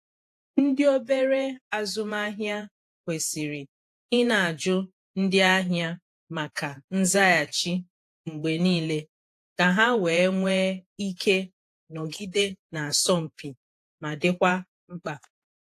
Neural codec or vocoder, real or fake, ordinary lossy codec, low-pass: vocoder, 48 kHz, 128 mel bands, Vocos; fake; AAC, 64 kbps; 14.4 kHz